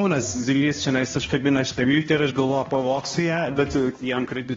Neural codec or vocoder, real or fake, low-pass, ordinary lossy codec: codec, 16 kHz, 1 kbps, X-Codec, HuBERT features, trained on balanced general audio; fake; 7.2 kHz; AAC, 24 kbps